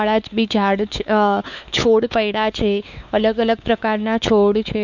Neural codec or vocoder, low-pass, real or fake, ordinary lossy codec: codec, 16 kHz, 4 kbps, X-Codec, WavLM features, trained on Multilingual LibriSpeech; 7.2 kHz; fake; none